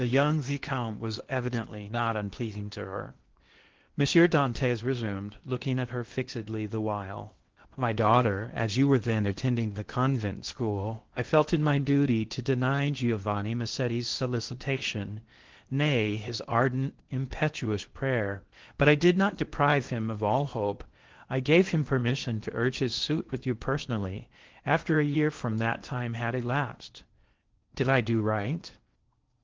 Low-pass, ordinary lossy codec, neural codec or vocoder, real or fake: 7.2 kHz; Opus, 16 kbps; codec, 16 kHz in and 24 kHz out, 0.8 kbps, FocalCodec, streaming, 65536 codes; fake